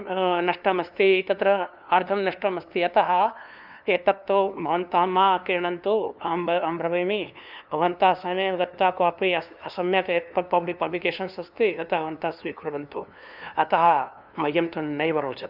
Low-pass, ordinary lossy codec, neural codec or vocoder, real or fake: 5.4 kHz; none; codec, 16 kHz, 2 kbps, FunCodec, trained on LibriTTS, 25 frames a second; fake